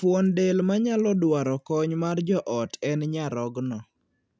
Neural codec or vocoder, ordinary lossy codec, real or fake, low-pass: none; none; real; none